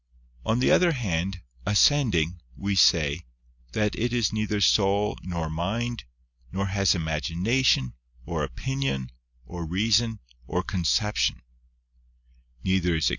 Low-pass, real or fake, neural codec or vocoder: 7.2 kHz; real; none